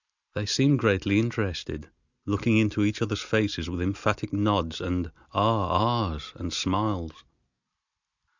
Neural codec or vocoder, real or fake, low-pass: none; real; 7.2 kHz